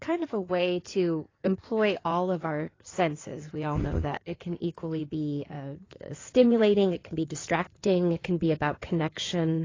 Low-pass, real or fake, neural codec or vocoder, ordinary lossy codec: 7.2 kHz; fake; codec, 16 kHz in and 24 kHz out, 2.2 kbps, FireRedTTS-2 codec; AAC, 32 kbps